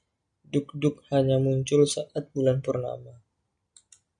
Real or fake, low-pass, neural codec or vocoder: real; 9.9 kHz; none